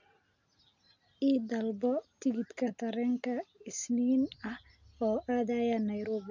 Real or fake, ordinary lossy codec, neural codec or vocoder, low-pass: real; none; none; 7.2 kHz